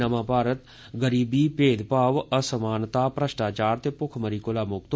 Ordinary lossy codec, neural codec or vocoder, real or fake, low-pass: none; none; real; none